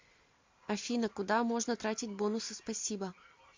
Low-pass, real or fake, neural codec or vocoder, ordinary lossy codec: 7.2 kHz; real; none; MP3, 48 kbps